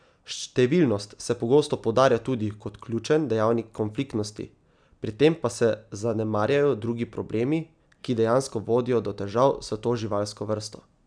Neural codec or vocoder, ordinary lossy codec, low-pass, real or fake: none; none; 9.9 kHz; real